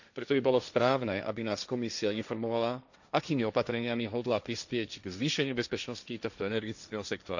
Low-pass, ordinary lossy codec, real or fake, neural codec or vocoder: 7.2 kHz; none; fake; codec, 16 kHz, 1.1 kbps, Voila-Tokenizer